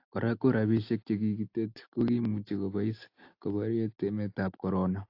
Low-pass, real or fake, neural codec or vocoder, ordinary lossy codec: 5.4 kHz; real; none; AAC, 32 kbps